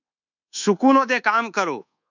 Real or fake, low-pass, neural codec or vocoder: fake; 7.2 kHz; codec, 24 kHz, 1.2 kbps, DualCodec